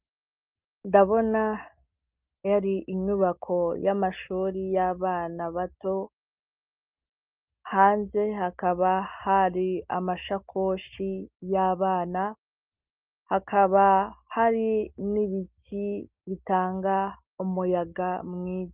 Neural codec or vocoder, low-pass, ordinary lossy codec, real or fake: none; 3.6 kHz; Opus, 24 kbps; real